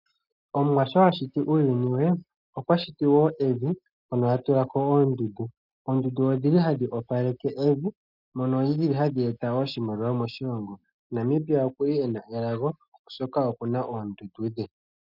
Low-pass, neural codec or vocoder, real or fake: 5.4 kHz; none; real